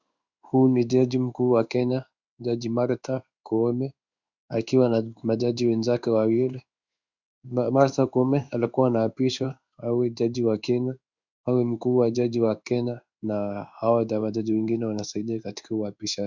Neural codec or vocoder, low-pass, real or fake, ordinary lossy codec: codec, 16 kHz in and 24 kHz out, 1 kbps, XY-Tokenizer; 7.2 kHz; fake; Opus, 64 kbps